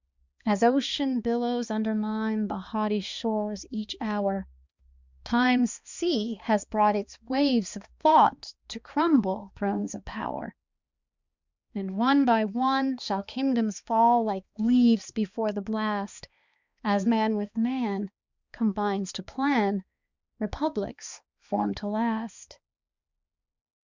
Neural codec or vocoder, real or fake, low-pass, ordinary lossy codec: codec, 16 kHz, 2 kbps, X-Codec, HuBERT features, trained on balanced general audio; fake; 7.2 kHz; Opus, 64 kbps